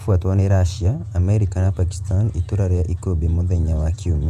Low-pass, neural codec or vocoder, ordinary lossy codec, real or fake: 14.4 kHz; vocoder, 48 kHz, 128 mel bands, Vocos; none; fake